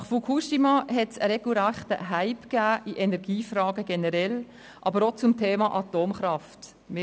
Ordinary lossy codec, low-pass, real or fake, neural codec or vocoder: none; none; real; none